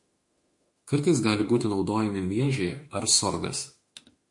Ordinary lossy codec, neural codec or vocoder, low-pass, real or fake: MP3, 48 kbps; autoencoder, 48 kHz, 32 numbers a frame, DAC-VAE, trained on Japanese speech; 10.8 kHz; fake